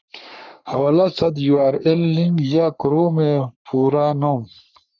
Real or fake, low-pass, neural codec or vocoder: fake; 7.2 kHz; codec, 44.1 kHz, 3.4 kbps, Pupu-Codec